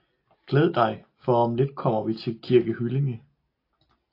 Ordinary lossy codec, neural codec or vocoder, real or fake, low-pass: AAC, 32 kbps; none; real; 5.4 kHz